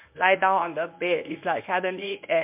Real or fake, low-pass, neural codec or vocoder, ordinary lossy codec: fake; 3.6 kHz; codec, 24 kHz, 0.9 kbps, WavTokenizer, medium speech release version 1; MP3, 32 kbps